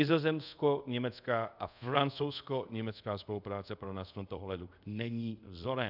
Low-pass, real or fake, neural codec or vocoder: 5.4 kHz; fake; codec, 24 kHz, 0.5 kbps, DualCodec